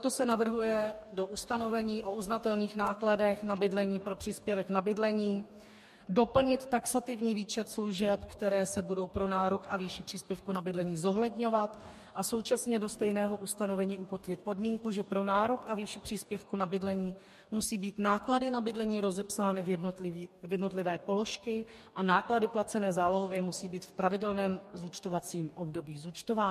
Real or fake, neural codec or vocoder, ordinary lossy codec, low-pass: fake; codec, 44.1 kHz, 2.6 kbps, DAC; MP3, 64 kbps; 14.4 kHz